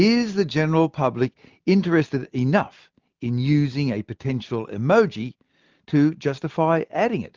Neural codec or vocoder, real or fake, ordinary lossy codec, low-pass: none; real; Opus, 32 kbps; 7.2 kHz